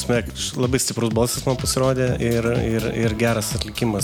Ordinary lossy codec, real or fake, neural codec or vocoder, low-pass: MP3, 96 kbps; real; none; 19.8 kHz